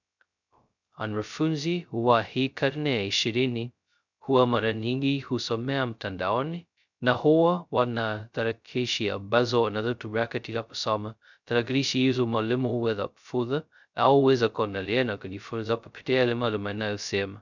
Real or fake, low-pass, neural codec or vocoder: fake; 7.2 kHz; codec, 16 kHz, 0.2 kbps, FocalCodec